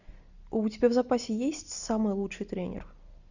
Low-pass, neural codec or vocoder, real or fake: 7.2 kHz; none; real